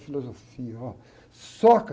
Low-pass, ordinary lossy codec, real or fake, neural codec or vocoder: none; none; real; none